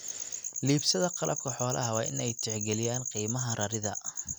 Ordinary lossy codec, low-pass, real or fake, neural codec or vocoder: none; none; real; none